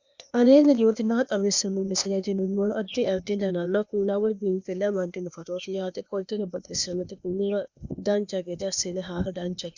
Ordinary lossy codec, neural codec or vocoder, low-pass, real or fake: Opus, 64 kbps; codec, 16 kHz, 0.8 kbps, ZipCodec; 7.2 kHz; fake